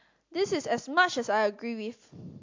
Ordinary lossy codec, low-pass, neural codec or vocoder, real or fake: MP3, 48 kbps; 7.2 kHz; none; real